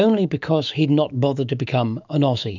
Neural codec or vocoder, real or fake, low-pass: autoencoder, 48 kHz, 128 numbers a frame, DAC-VAE, trained on Japanese speech; fake; 7.2 kHz